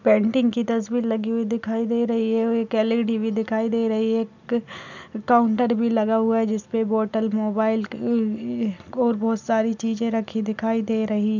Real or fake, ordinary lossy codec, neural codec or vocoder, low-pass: real; none; none; 7.2 kHz